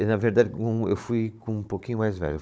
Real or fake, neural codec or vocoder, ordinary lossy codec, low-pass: fake; codec, 16 kHz, 16 kbps, FunCodec, trained on Chinese and English, 50 frames a second; none; none